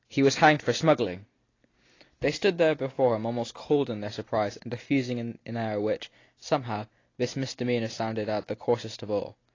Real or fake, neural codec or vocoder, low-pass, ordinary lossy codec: real; none; 7.2 kHz; AAC, 32 kbps